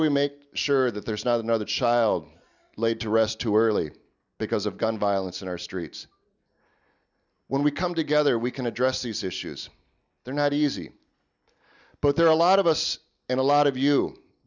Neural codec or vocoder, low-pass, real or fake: none; 7.2 kHz; real